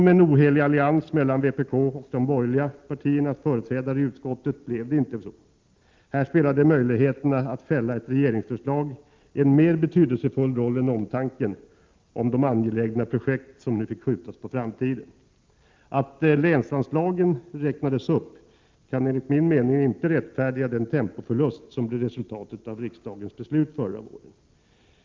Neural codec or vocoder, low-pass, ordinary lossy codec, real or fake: none; 7.2 kHz; Opus, 24 kbps; real